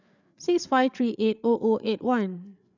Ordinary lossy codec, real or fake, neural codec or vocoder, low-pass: none; fake; codec, 16 kHz, 8 kbps, FreqCodec, larger model; 7.2 kHz